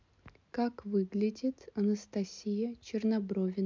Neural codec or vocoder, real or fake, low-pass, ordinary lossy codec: none; real; 7.2 kHz; MP3, 64 kbps